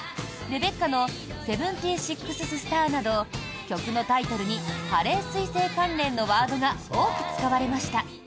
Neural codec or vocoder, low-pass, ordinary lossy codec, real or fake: none; none; none; real